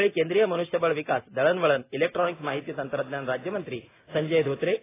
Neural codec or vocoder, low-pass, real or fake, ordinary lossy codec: none; 3.6 kHz; real; AAC, 16 kbps